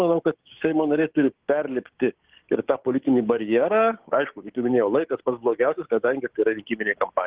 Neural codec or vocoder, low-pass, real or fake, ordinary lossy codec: codec, 16 kHz, 6 kbps, DAC; 3.6 kHz; fake; Opus, 16 kbps